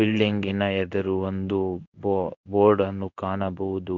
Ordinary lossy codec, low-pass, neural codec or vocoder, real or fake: none; 7.2 kHz; codec, 16 kHz in and 24 kHz out, 1 kbps, XY-Tokenizer; fake